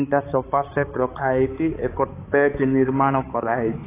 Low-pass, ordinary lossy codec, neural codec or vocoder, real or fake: 3.6 kHz; AAC, 16 kbps; codec, 16 kHz, 4 kbps, X-Codec, HuBERT features, trained on balanced general audio; fake